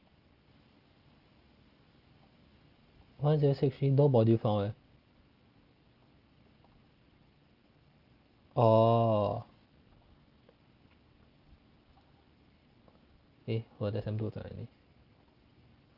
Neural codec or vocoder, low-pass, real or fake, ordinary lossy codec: none; 5.4 kHz; real; Opus, 32 kbps